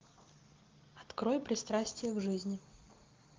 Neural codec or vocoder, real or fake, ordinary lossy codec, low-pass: none; real; Opus, 32 kbps; 7.2 kHz